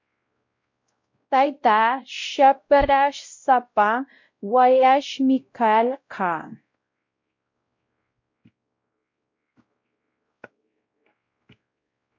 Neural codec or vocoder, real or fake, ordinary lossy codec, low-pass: codec, 16 kHz, 0.5 kbps, X-Codec, WavLM features, trained on Multilingual LibriSpeech; fake; MP3, 48 kbps; 7.2 kHz